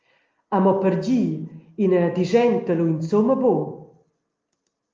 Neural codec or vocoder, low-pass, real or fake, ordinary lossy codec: none; 7.2 kHz; real; Opus, 24 kbps